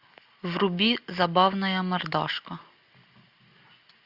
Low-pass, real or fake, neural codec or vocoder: 5.4 kHz; real; none